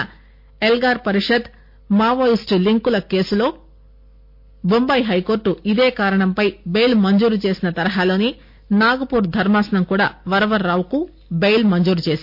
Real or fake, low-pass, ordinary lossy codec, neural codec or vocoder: real; 5.4 kHz; MP3, 32 kbps; none